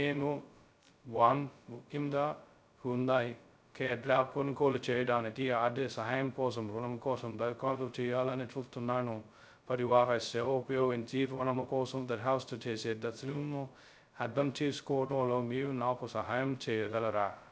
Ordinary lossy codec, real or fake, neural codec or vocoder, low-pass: none; fake; codec, 16 kHz, 0.2 kbps, FocalCodec; none